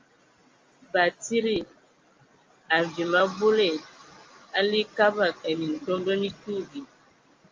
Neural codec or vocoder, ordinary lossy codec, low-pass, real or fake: none; Opus, 32 kbps; 7.2 kHz; real